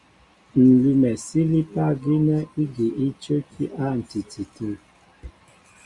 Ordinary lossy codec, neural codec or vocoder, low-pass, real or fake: Opus, 64 kbps; none; 10.8 kHz; real